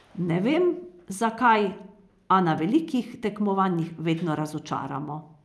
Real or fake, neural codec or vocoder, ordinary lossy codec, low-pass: real; none; none; none